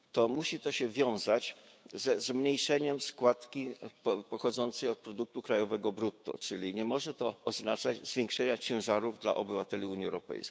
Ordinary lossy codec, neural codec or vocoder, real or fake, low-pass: none; codec, 16 kHz, 6 kbps, DAC; fake; none